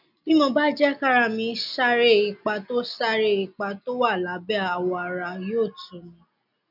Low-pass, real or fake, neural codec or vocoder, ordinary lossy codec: 5.4 kHz; real; none; none